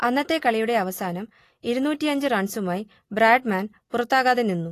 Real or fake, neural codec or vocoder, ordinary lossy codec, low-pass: real; none; AAC, 48 kbps; 14.4 kHz